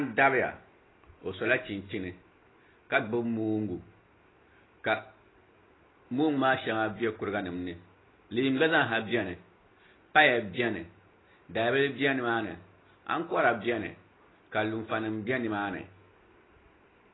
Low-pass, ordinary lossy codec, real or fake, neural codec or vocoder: 7.2 kHz; AAC, 16 kbps; real; none